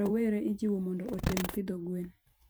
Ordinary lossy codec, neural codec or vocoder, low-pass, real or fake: none; vocoder, 44.1 kHz, 128 mel bands every 512 samples, BigVGAN v2; none; fake